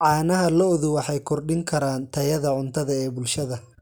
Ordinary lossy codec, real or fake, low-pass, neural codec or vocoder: none; real; none; none